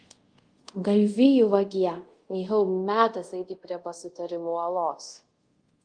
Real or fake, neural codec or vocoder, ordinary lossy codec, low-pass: fake; codec, 24 kHz, 0.5 kbps, DualCodec; Opus, 24 kbps; 9.9 kHz